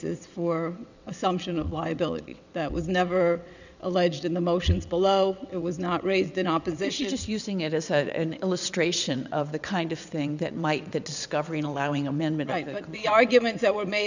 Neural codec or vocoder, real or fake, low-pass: vocoder, 22.05 kHz, 80 mel bands, WaveNeXt; fake; 7.2 kHz